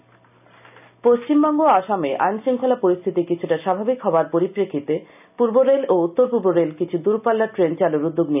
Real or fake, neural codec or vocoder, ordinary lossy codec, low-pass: real; none; none; 3.6 kHz